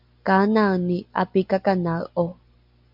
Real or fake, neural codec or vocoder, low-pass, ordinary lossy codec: real; none; 5.4 kHz; MP3, 48 kbps